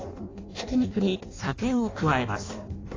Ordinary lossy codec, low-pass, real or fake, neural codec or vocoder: AAC, 32 kbps; 7.2 kHz; fake; codec, 16 kHz in and 24 kHz out, 0.6 kbps, FireRedTTS-2 codec